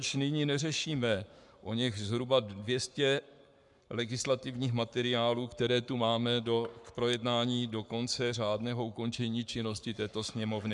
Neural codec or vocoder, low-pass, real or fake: codec, 44.1 kHz, 7.8 kbps, Pupu-Codec; 10.8 kHz; fake